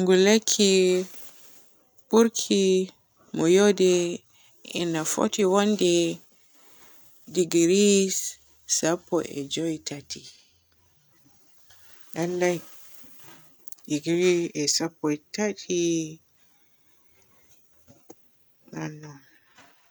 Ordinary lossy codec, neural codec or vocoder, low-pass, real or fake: none; none; none; real